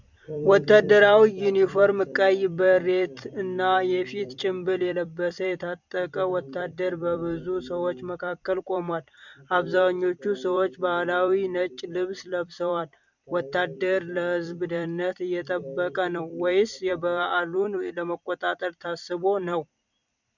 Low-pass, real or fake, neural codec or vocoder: 7.2 kHz; fake; vocoder, 44.1 kHz, 128 mel bands every 256 samples, BigVGAN v2